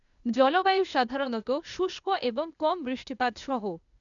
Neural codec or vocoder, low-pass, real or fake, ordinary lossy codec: codec, 16 kHz, 0.8 kbps, ZipCodec; 7.2 kHz; fake; none